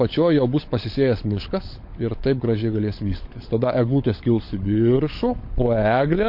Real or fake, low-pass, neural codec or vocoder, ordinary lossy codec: fake; 5.4 kHz; codec, 16 kHz, 16 kbps, FunCodec, trained on LibriTTS, 50 frames a second; MP3, 32 kbps